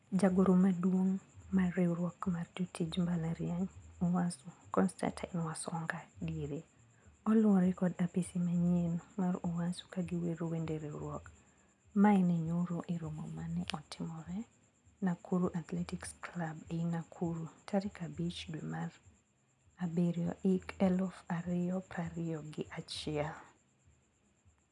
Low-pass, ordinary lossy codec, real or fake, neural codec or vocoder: 10.8 kHz; none; real; none